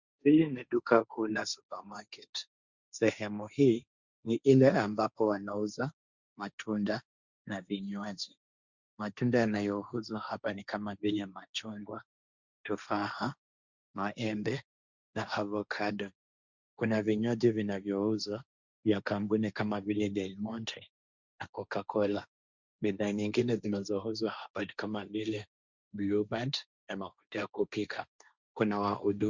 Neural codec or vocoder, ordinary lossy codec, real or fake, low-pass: codec, 16 kHz, 1.1 kbps, Voila-Tokenizer; Opus, 64 kbps; fake; 7.2 kHz